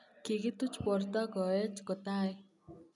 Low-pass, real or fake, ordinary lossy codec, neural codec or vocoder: 10.8 kHz; real; none; none